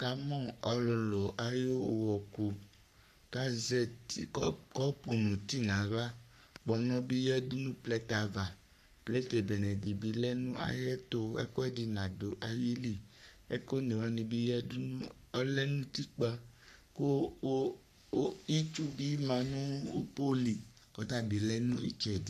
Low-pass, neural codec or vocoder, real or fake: 14.4 kHz; codec, 44.1 kHz, 3.4 kbps, Pupu-Codec; fake